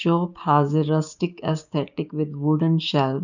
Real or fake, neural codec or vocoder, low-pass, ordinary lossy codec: fake; codec, 16 kHz, 6 kbps, DAC; 7.2 kHz; none